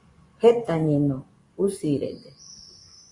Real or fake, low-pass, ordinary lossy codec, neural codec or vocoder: fake; 10.8 kHz; Opus, 64 kbps; vocoder, 24 kHz, 100 mel bands, Vocos